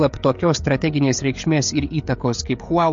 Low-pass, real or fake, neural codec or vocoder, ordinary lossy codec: 7.2 kHz; fake; codec, 16 kHz, 16 kbps, FreqCodec, smaller model; MP3, 48 kbps